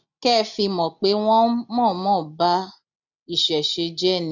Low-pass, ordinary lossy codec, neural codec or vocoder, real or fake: 7.2 kHz; none; none; real